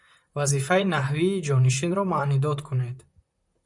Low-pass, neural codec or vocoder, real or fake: 10.8 kHz; vocoder, 44.1 kHz, 128 mel bands, Pupu-Vocoder; fake